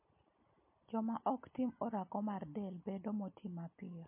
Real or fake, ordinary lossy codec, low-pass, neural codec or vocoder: real; MP3, 24 kbps; 3.6 kHz; none